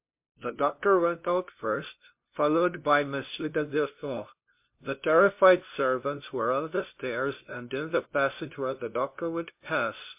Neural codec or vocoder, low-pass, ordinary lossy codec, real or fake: codec, 16 kHz, 0.5 kbps, FunCodec, trained on LibriTTS, 25 frames a second; 3.6 kHz; MP3, 32 kbps; fake